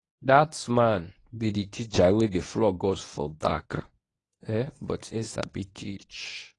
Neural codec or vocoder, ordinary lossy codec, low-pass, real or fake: codec, 24 kHz, 0.9 kbps, WavTokenizer, medium speech release version 1; AAC, 32 kbps; 10.8 kHz; fake